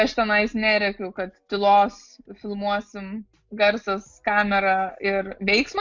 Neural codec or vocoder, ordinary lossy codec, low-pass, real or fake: none; MP3, 48 kbps; 7.2 kHz; real